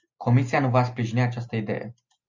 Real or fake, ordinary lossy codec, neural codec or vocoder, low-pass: real; MP3, 64 kbps; none; 7.2 kHz